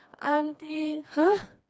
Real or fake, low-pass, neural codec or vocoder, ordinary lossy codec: fake; none; codec, 16 kHz, 2 kbps, FreqCodec, smaller model; none